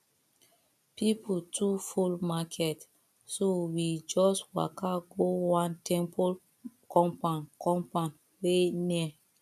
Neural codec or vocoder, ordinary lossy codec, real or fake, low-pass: none; none; real; 14.4 kHz